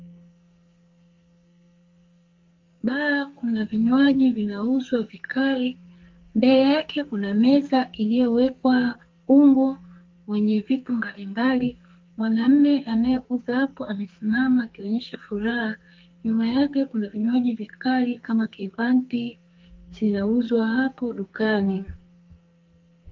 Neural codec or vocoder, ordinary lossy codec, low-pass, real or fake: codec, 44.1 kHz, 2.6 kbps, SNAC; Opus, 32 kbps; 7.2 kHz; fake